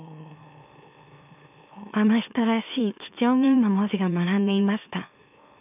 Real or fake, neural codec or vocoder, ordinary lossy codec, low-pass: fake; autoencoder, 44.1 kHz, a latent of 192 numbers a frame, MeloTTS; none; 3.6 kHz